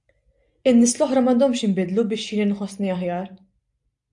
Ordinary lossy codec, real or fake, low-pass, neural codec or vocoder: AAC, 64 kbps; real; 10.8 kHz; none